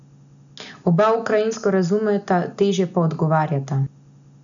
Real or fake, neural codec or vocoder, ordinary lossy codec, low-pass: real; none; none; 7.2 kHz